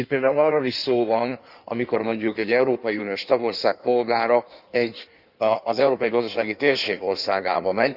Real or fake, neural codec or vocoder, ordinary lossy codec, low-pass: fake; codec, 16 kHz in and 24 kHz out, 1.1 kbps, FireRedTTS-2 codec; Opus, 64 kbps; 5.4 kHz